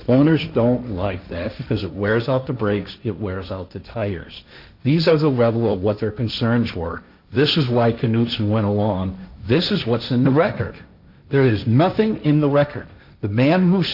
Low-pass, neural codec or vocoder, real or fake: 5.4 kHz; codec, 16 kHz, 1.1 kbps, Voila-Tokenizer; fake